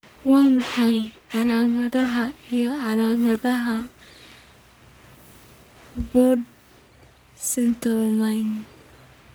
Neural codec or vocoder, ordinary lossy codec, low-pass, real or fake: codec, 44.1 kHz, 1.7 kbps, Pupu-Codec; none; none; fake